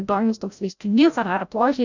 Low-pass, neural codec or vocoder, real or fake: 7.2 kHz; codec, 16 kHz, 0.5 kbps, FreqCodec, larger model; fake